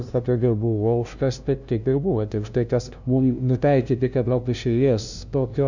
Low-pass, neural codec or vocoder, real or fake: 7.2 kHz; codec, 16 kHz, 0.5 kbps, FunCodec, trained on LibriTTS, 25 frames a second; fake